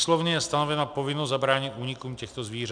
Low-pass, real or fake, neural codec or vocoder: 9.9 kHz; real; none